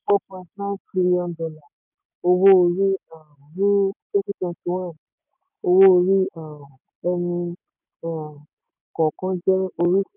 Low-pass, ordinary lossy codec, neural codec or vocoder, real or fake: 3.6 kHz; none; none; real